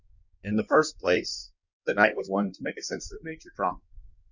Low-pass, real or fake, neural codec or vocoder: 7.2 kHz; fake; codec, 16 kHz in and 24 kHz out, 1.1 kbps, FireRedTTS-2 codec